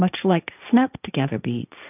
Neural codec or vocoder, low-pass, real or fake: codec, 16 kHz, 1.1 kbps, Voila-Tokenizer; 3.6 kHz; fake